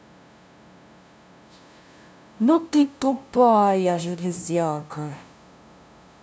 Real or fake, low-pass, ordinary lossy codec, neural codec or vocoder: fake; none; none; codec, 16 kHz, 0.5 kbps, FunCodec, trained on LibriTTS, 25 frames a second